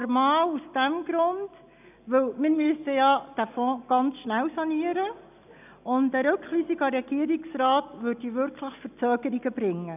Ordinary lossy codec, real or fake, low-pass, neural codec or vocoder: none; real; 3.6 kHz; none